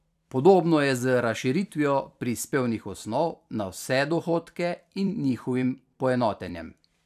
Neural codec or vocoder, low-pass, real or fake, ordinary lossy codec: vocoder, 44.1 kHz, 128 mel bands every 256 samples, BigVGAN v2; 14.4 kHz; fake; none